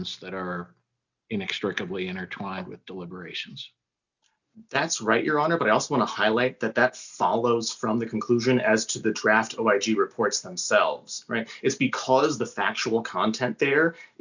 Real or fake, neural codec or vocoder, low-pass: real; none; 7.2 kHz